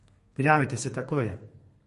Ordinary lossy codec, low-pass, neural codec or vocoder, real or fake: MP3, 48 kbps; 14.4 kHz; codec, 32 kHz, 1.9 kbps, SNAC; fake